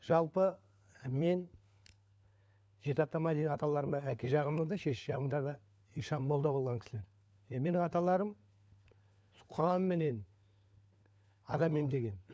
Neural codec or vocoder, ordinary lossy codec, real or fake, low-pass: codec, 16 kHz, 4 kbps, FunCodec, trained on LibriTTS, 50 frames a second; none; fake; none